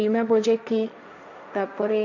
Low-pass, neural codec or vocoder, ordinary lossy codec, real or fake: 7.2 kHz; codec, 16 kHz, 1.1 kbps, Voila-Tokenizer; none; fake